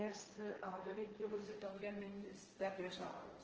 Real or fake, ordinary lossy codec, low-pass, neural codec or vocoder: fake; Opus, 16 kbps; 7.2 kHz; codec, 16 kHz, 1.1 kbps, Voila-Tokenizer